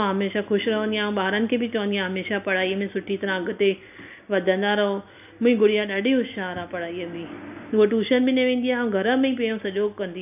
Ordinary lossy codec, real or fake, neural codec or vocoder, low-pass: none; real; none; 3.6 kHz